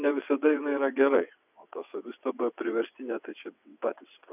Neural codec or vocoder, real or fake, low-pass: vocoder, 22.05 kHz, 80 mel bands, WaveNeXt; fake; 3.6 kHz